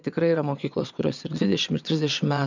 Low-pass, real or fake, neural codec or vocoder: 7.2 kHz; fake; vocoder, 22.05 kHz, 80 mel bands, WaveNeXt